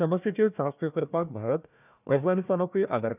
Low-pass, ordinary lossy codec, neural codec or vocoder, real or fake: 3.6 kHz; none; codec, 16 kHz, 1 kbps, FunCodec, trained on Chinese and English, 50 frames a second; fake